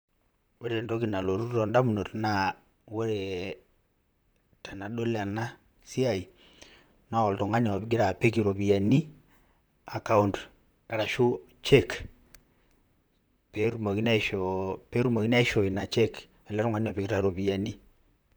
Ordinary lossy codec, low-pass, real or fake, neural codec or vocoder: none; none; fake; vocoder, 44.1 kHz, 128 mel bands, Pupu-Vocoder